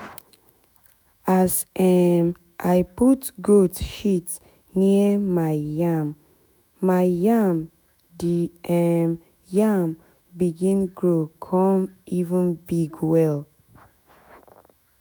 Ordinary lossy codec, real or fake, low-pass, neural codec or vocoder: none; fake; none; autoencoder, 48 kHz, 128 numbers a frame, DAC-VAE, trained on Japanese speech